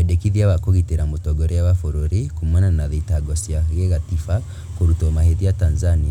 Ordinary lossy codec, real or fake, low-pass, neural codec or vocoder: none; real; 19.8 kHz; none